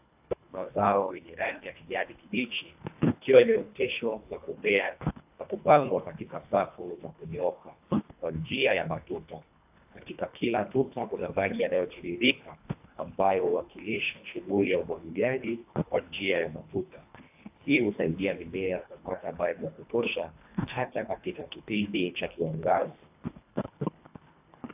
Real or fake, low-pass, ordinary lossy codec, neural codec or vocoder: fake; 3.6 kHz; AAC, 32 kbps; codec, 24 kHz, 1.5 kbps, HILCodec